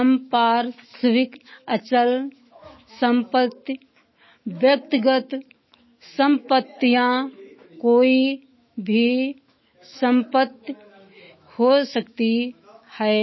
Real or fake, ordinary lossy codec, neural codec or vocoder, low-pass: fake; MP3, 24 kbps; autoencoder, 48 kHz, 128 numbers a frame, DAC-VAE, trained on Japanese speech; 7.2 kHz